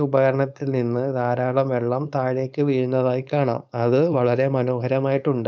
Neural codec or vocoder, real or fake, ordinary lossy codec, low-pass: codec, 16 kHz, 4.8 kbps, FACodec; fake; none; none